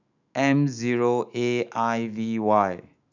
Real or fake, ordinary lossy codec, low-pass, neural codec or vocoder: fake; none; 7.2 kHz; autoencoder, 48 kHz, 128 numbers a frame, DAC-VAE, trained on Japanese speech